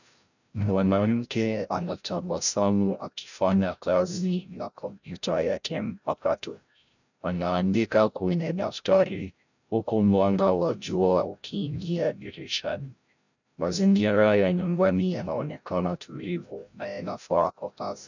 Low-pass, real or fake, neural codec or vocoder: 7.2 kHz; fake; codec, 16 kHz, 0.5 kbps, FreqCodec, larger model